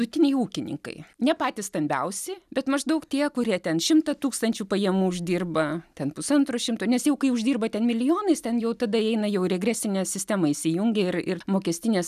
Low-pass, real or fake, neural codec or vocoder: 14.4 kHz; real; none